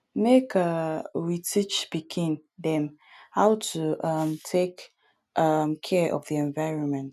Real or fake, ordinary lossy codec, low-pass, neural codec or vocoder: real; none; 14.4 kHz; none